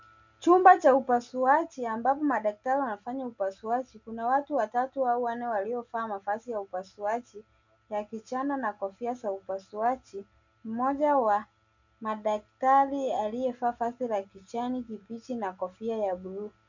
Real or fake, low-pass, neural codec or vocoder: real; 7.2 kHz; none